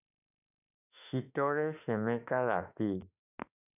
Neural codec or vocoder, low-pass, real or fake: autoencoder, 48 kHz, 32 numbers a frame, DAC-VAE, trained on Japanese speech; 3.6 kHz; fake